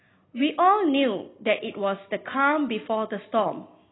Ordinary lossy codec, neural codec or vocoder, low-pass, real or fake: AAC, 16 kbps; none; 7.2 kHz; real